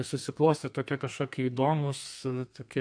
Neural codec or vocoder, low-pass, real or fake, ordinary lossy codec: codec, 32 kHz, 1.9 kbps, SNAC; 9.9 kHz; fake; AAC, 64 kbps